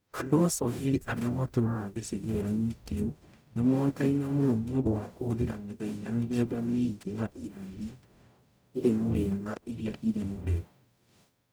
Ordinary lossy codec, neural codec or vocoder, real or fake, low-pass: none; codec, 44.1 kHz, 0.9 kbps, DAC; fake; none